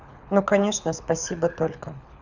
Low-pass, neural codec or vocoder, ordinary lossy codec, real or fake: 7.2 kHz; codec, 24 kHz, 6 kbps, HILCodec; none; fake